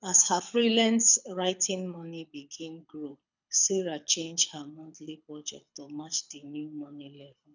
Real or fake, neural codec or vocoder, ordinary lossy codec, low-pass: fake; codec, 24 kHz, 6 kbps, HILCodec; none; 7.2 kHz